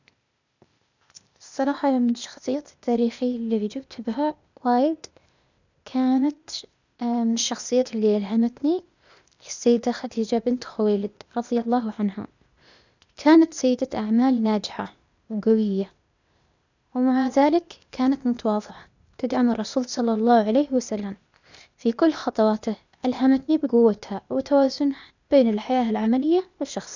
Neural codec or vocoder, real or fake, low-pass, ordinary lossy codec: codec, 16 kHz, 0.8 kbps, ZipCodec; fake; 7.2 kHz; none